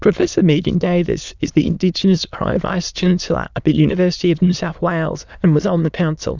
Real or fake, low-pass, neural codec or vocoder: fake; 7.2 kHz; autoencoder, 22.05 kHz, a latent of 192 numbers a frame, VITS, trained on many speakers